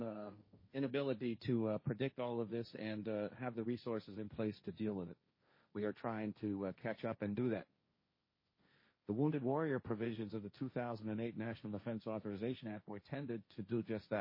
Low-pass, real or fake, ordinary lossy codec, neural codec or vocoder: 5.4 kHz; fake; MP3, 24 kbps; codec, 16 kHz, 1.1 kbps, Voila-Tokenizer